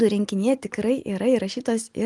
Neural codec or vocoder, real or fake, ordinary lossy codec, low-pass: none; real; Opus, 32 kbps; 10.8 kHz